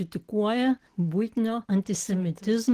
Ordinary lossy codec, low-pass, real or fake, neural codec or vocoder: Opus, 16 kbps; 14.4 kHz; real; none